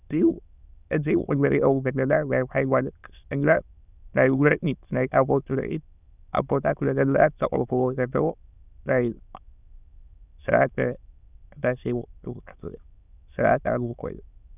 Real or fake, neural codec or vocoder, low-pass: fake; autoencoder, 22.05 kHz, a latent of 192 numbers a frame, VITS, trained on many speakers; 3.6 kHz